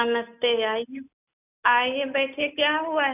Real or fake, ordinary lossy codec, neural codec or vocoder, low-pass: real; none; none; 3.6 kHz